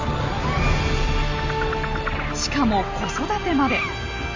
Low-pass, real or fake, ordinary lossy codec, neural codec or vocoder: 7.2 kHz; real; Opus, 32 kbps; none